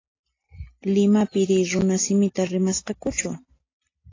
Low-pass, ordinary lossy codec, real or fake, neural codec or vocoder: 7.2 kHz; AAC, 32 kbps; real; none